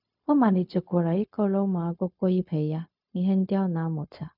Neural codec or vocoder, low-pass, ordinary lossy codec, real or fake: codec, 16 kHz, 0.4 kbps, LongCat-Audio-Codec; 5.4 kHz; none; fake